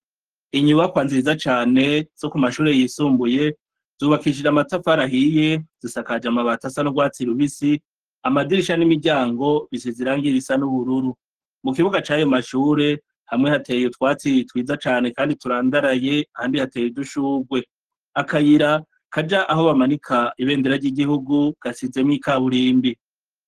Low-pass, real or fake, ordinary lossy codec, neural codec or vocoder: 14.4 kHz; fake; Opus, 16 kbps; codec, 44.1 kHz, 7.8 kbps, Pupu-Codec